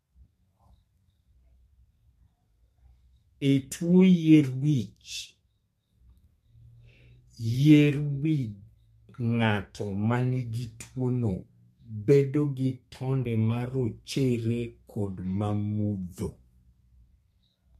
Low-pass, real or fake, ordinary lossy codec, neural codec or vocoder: 14.4 kHz; fake; MP3, 64 kbps; codec, 32 kHz, 1.9 kbps, SNAC